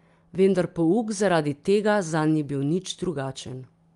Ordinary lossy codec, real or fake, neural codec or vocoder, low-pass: Opus, 32 kbps; real; none; 10.8 kHz